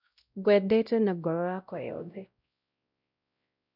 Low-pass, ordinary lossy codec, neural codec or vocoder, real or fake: 5.4 kHz; none; codec, 16 kHz, 0.5 kbps, X-Codec, WavLM features, trained on Multilingual LibriSpeech; fake